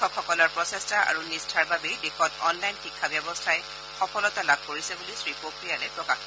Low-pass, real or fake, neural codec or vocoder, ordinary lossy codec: none; real; none; none